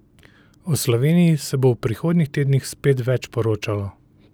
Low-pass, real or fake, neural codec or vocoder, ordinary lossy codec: none; real; none; none